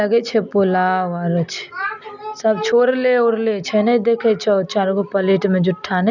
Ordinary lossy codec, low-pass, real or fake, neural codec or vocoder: none; 7.2 kHz; real; none